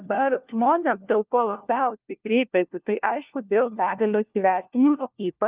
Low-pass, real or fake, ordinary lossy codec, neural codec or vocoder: 3.6 kHz; fake; Opus, 32 kbps; codec, 16 kHz, 1 kbps, FunCodec, trained on LibriTTS, 50 frames a second